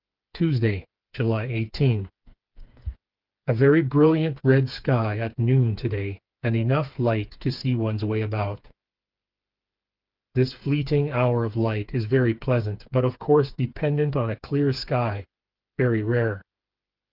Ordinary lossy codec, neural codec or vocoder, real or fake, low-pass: Opus, 24 kbps; codec, 16 kHz, 4 kbps, FreqCodec, smaller model; fake; 5.4 kHz